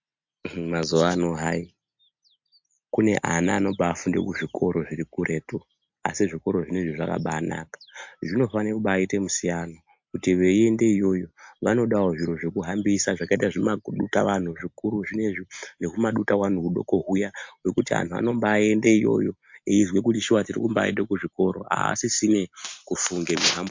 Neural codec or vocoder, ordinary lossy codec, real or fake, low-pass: none; MP3, 48 kbps; real; 7.2 kHz